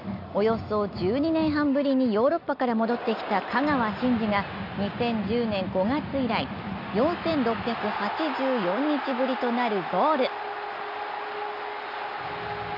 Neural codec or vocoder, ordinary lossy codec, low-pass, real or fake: none; none; 5.4 kHz; real